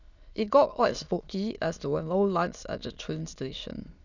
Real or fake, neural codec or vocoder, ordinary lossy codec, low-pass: fake; autoencoder, 22.05 kHz, a latent of 192 numbers a frame, VITS, trained on many speakers; none; 7.2 kHz